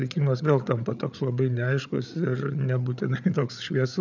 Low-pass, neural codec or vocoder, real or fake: 7.2 kHz; codec, 16 kHz, 8 kbps, FreqCodec, larger model; fake